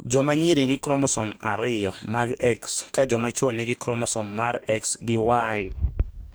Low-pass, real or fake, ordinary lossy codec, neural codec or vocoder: none; fake; none; codec, 44.1 kHz, 2.6 kbps, DAC